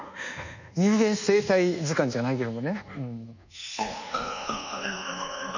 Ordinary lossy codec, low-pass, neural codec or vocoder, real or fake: none; 7.2 kHz; codec, 24 kHz, 1.2 kbps, DualCodec; fake